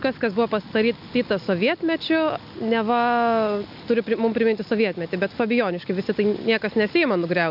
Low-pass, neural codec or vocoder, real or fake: 5.4 kHz; none; real